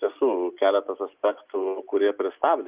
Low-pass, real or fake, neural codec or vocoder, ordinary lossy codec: 3.6 kHz; real; none; Opus, 24 kbps